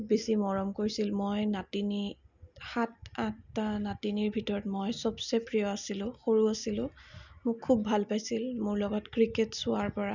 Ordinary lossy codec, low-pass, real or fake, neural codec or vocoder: none; 7.2 kHz; real; none